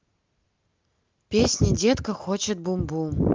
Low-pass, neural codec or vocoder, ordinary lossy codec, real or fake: 7.2 kHz; none; Opus, 32 kbps; real